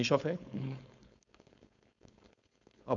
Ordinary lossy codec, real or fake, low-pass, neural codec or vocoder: none; fake; 7.2 kHz; codec, 16 kHz, 4.8 kbps, FACodec